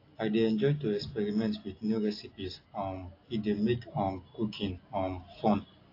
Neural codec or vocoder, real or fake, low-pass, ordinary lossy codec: none; real; 5.4 kHz; AAC, 32 kbps